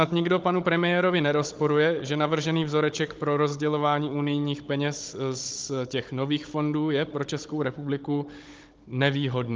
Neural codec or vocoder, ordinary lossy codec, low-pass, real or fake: codec, 16 kHz, 16 kbps, FunCodec, trained on Chinese and English, 50 frames a second; Opus, 24 kbps; 7.2 kHz; fake